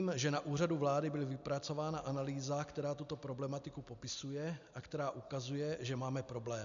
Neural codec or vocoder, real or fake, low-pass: none; real; 7.2 kHz